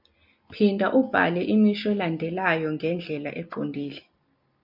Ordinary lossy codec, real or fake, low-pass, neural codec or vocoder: MP3, 48 kbps; real; 5.4 kHz; none